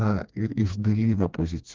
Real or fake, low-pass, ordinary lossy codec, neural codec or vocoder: fake; 7.2 kHz; Opus, 24 kbps; codec, 16 kHz, 2 kbps, FreqCodec, smaller model